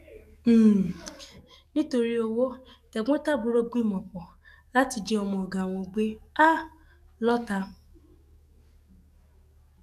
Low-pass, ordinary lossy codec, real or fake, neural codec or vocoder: 14.4 kHz; none; fake; codec, 44.1 kHz, 7.8 kbps, DAC